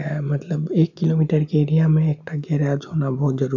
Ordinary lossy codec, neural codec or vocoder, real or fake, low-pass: none; none; real; 7.2 kHz